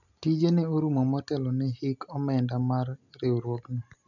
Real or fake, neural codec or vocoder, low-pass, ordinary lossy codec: real; none; 7.2 kHz; none